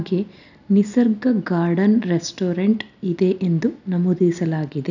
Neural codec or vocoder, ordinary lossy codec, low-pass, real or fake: none; none; 7.2 kHz; real